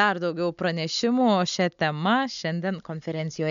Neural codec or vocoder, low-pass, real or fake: none; 7.2 kHz; real